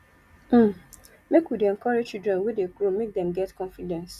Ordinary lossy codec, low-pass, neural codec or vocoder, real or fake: Opus, 64 kbps; 14.4 kHz; none; real